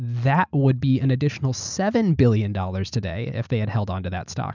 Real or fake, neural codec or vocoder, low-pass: real; none; 7.2 kHz